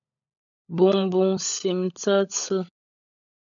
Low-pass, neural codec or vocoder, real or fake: 7.2 kHz; codec, 16 kHz, 16 kbps, FunCodec, trained on LibriTTS, 50 frames a second; fake